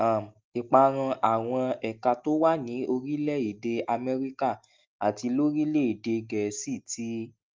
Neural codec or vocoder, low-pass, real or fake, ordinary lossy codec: none; 7.2 kHz; real; Opus, 32 kbps